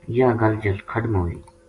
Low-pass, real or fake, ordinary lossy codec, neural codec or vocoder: 10.8 kHz; real; AAC, 48 kbps; none